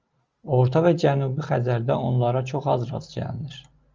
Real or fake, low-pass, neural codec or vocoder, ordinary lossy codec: real; 7.2 kHz; none; Opus, 24 kbps